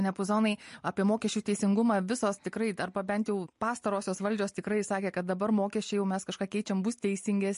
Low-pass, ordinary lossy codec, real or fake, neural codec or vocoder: 10.8 kHz; MP3, 48 kbps; real; none